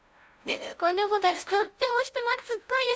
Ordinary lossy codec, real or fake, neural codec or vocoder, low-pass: none; fake; codec, 16 kHz, 0.5 kbps, FunCodec, trained on LibriTTS, 25 frames a second; none